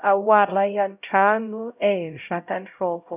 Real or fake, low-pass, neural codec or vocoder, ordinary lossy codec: fake; 3.6 kHz; codec, 16 kHz, 0.5 kbps, X-Codec, HuBERT features, trained on LibriSpeech; none